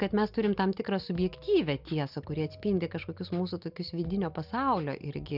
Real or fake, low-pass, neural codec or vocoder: real; 5.4 kHz; none